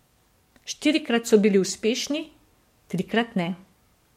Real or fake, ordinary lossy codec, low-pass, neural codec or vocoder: fake; MP3, 64 kbps; 19.8 kHz; codec, 44.1 kHz, 7.8 kbps, DAC